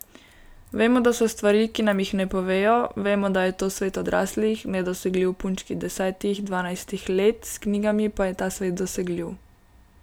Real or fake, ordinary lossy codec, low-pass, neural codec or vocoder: real; none; none; none